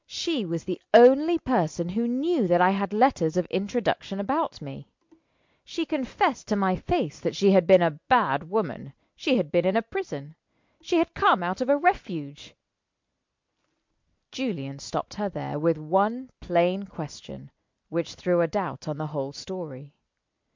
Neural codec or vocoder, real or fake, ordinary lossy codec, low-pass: none; real; MP3, 64 kbps; 7.2 kHz